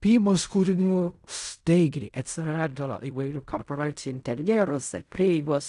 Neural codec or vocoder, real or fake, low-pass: codec, 16 kHz in and 24 kHz out, 0.4 kbps, LongCat-Audio-Codec, fine tuned four codebook decoder; fake; 10.8 kHz